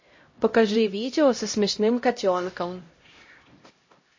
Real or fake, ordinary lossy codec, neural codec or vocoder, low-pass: fake; MP3, 32 kbps; codec, 16 kHz, 0.5 kbps, X-Codec, HuBERT features, trained on LibriSpeech; 7.2 kHz